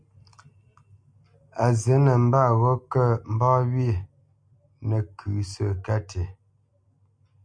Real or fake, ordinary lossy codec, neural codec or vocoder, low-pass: real; MP3, 64 kbps; none; 9.9 kHz